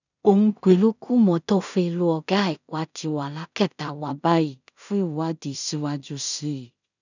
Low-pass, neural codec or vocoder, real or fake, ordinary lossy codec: 7.2 kHz; codec, 16 kHz in and 24 kHz out, 0.4 kbps, LongCat-Audio-Codec, two codebook decoder; fake; none